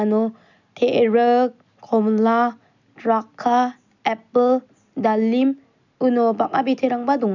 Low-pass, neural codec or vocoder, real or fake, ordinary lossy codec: 7.2 kHz; none; real; none